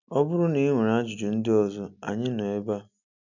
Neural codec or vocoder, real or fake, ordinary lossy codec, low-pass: none; real; none; 7.2 kHz